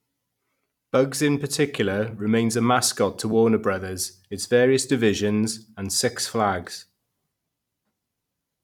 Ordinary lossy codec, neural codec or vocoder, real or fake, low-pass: none; vocoder, 44.1 kHz, 128 mel bands every 256 samples, BigVGAN v2; fake; 19.8 kHz